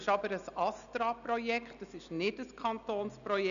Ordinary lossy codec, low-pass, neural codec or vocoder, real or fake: none; 7.2 kHz; none; real